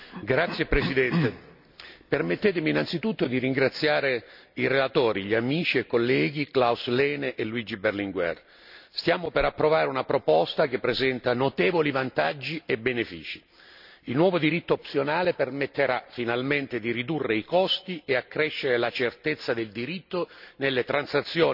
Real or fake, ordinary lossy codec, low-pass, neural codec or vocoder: real; none; 5.4 kHz; none